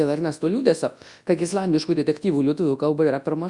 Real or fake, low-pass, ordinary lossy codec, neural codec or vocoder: fake; 10.8 kHz; Opus, 64 kbps; codec, 24 kHz, 0.9 kbps, WavTokenizer, large speech release